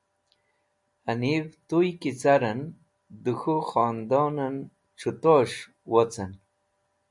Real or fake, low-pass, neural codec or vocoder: real; 10.8 kHz; none